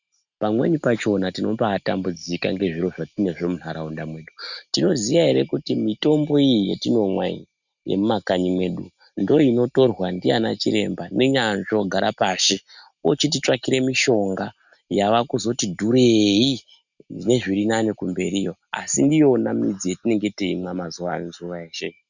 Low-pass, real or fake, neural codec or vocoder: 7.2 kHz; real; none